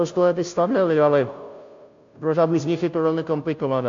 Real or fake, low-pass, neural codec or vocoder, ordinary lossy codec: fake; 7.2 kHz; codec, 16 kHz, 0.5 kbps, FunCodec, trained on Chinese and English, 25 frames a second; MP3, 48 kbps